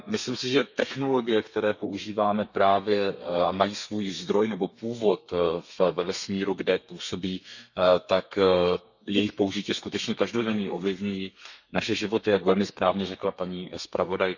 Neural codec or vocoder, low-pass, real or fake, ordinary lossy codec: codec, 32 kHz, 1.9 kbps, SNAC; 7.2 kHz; fake; none